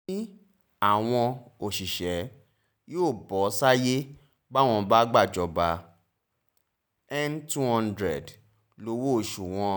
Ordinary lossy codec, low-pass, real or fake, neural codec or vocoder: none; none; real; none